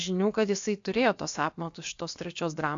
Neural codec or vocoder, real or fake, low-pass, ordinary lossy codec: codec, 16 kHz, about 1 kbps, DyCAST, with the encoder's durations; fake; 7.2 kHz; AAC, 64 kbps